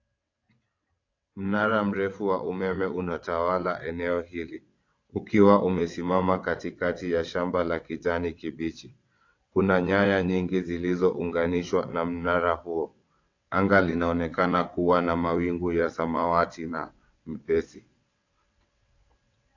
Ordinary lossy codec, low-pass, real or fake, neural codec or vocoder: AAC, 48 kbps; 7.2 kHz; fake; vocoder, 22.05 kHz, 80 mel bands, WaveNeXt